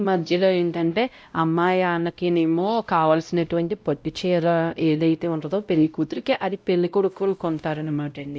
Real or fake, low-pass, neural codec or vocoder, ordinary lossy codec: fake; none; codec, 16 kHz, 0.5 kbps, X-Codec, WavLM features, trained on Multilingual LibriSpeech; none